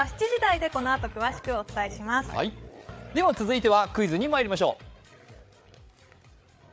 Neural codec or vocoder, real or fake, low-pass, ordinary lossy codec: codec, 16 kHz, 8 kbps, FreqCodec, larger model; fake; none; none